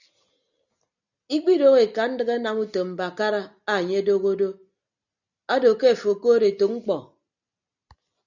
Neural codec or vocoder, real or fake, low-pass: none; real; 7.2 kHz